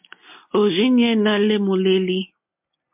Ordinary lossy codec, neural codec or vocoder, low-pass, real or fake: MP3, 32 kbps; none; 3.6 kHz; real